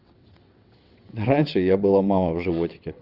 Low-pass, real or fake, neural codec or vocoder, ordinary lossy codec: 5.4 kHz; fake; vocoder, 22.05 kHz, 80 mel bands, WaveNeXt; Opus, 32 kbps